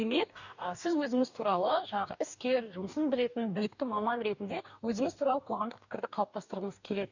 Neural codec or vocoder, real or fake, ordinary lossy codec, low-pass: codec, 44.1 kHz, 2.6 kbps, DAC; fake; none; 7.2 kHz